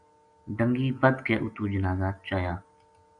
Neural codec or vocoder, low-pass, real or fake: none; 9.9 kHz; real